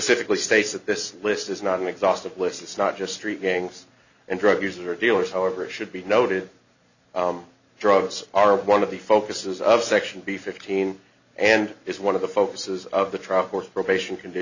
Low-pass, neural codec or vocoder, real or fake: 7.2 kHz; none; real